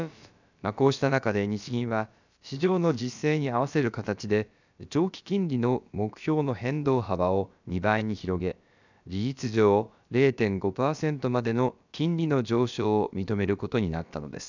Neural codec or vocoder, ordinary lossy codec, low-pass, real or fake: codec, 16 kHz, about 1 kbps, DyCAST, with the encoder's durations; none; 7.2 kHz; fake